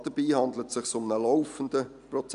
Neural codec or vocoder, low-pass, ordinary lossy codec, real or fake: none; 10.8 kHz; none; real